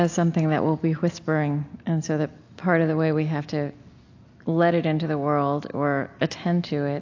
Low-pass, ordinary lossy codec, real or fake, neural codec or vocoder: 7.2 kHz; AAC, 48 kbps; real; none